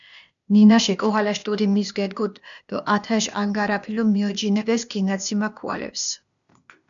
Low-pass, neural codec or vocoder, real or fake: 7.2 kHz; codec, 16 kHz, 0.8 kbps, ZipCodec; fake